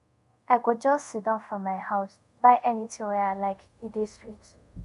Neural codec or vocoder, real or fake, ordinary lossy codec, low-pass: codec, 24 kHz, 0.5 kbps, DualCodec; fake; MP3, 64 kbps; 10.8 kHz